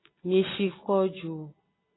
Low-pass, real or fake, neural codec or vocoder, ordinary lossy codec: 7.2 kHz; real; none; AAC, 16 kbps